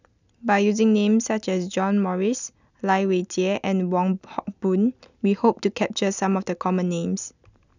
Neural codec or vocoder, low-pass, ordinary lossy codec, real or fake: none; 7.2 kHz; none; real